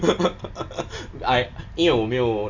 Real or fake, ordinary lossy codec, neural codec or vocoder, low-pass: real; none; none; 7.2 kHz